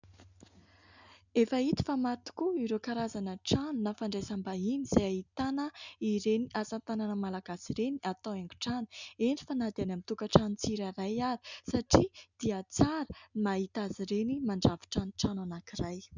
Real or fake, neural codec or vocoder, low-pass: real; none; 7.2 kHz